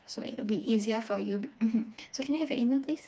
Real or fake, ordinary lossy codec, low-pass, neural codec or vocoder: fake; none; none; codec, 16 kHz, 2 kbps, FreqCodec, smaller model